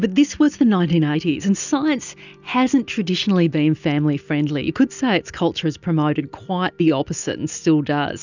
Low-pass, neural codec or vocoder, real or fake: 7.2 kHz; none; real